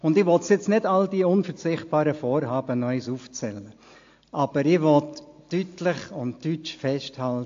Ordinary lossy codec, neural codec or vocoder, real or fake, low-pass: AAC, 48 kbps; none; real; 7.2 kHz